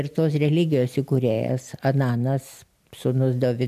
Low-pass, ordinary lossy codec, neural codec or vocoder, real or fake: 14.4 kHz; AAC, 96 kbps; none; real